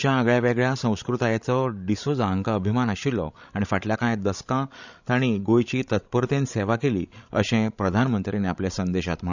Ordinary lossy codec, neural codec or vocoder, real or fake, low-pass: none; codec, 16 kHz, 16 kbps, FreqCodec, larger model; fake; 7.2 kHz